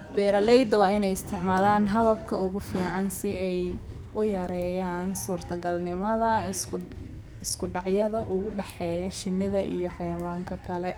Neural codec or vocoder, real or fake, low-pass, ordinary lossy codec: codec, 44.1 kHz, 2.6 kbps, SNAC; fake; none; none